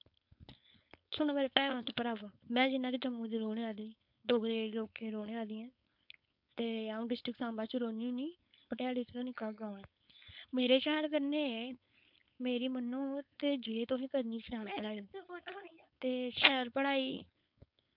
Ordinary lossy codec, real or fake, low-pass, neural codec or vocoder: MP3, 48 kbps; fake; 5.4 kHz; codec, 16 kHz, 4.8 kbps, FACodec